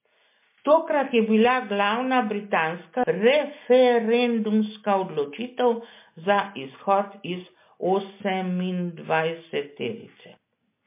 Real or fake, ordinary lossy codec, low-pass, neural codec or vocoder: real; MP3, 24 kbps; 3.6 kHz; none